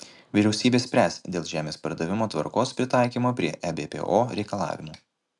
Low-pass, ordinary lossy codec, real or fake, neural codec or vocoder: 10.8 kHz; MP3, 96 kbps; real; none